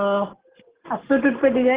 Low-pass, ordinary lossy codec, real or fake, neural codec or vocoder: 3.6 kHz; Opus, 16 kbps; real; none